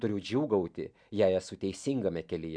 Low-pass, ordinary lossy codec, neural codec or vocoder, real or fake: 9.9 kHz; MP3, 96 kbps; none; real